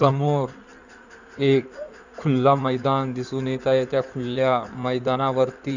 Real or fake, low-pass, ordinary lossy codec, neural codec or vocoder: fake; 7.2 kHz; none; codec, 16 kHz in and 24 kHz out, 2.2 kbps, FireRedTTS-2 codec